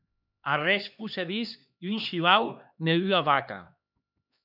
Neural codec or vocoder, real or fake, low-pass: codec, 16 kHz, 2 kbps, X-Codec, HuBERT features, trained on LibriSpeech; fake; 5.4 kHz